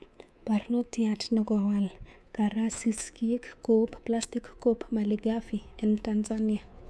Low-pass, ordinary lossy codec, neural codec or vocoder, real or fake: none; none; codec, 24 kHz, 3.1 kbps, DualCodec; fake